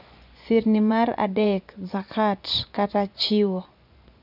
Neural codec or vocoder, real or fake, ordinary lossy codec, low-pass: vocoder, 24 kHz, 100 mel bands, Vocos; fake; none; 5.4 kHz